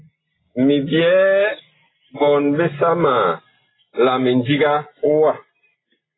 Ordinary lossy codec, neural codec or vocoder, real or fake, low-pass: AAC, 16 kbps; none; real; 7.2 kHz